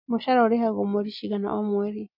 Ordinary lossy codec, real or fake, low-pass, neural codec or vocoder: MP3, 48 kbps; real; 5.4 kHz; none